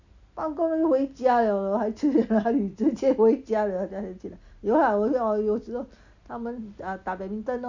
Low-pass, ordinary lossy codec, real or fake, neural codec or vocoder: 7.2 kHz; Opus, 64 kbps; real; none